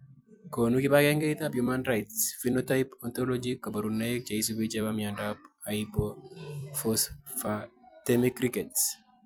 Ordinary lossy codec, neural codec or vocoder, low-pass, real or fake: none; vocoder, 44.1 kHz, 128 mel bands every 256 samples, BigVGAN v2; none; fake